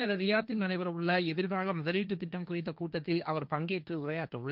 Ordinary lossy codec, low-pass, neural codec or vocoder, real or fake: none; 5.4 kHz; codec, 16 kHz, 1.1 kbps, Voila-Tokenizer; fake